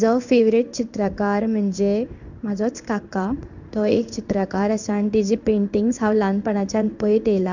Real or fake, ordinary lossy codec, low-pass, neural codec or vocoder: fake; none; 7.2 kHz; codec, 16 kHz in and 24 kHz out, 1 kbps, XY-Tokenizer